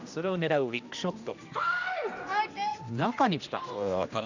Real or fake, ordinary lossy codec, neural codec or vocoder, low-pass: fake; none; codec, 16 kHz, 1 kbps, X-Codec, HuBERT features, trained on general audio; 7.2 kHz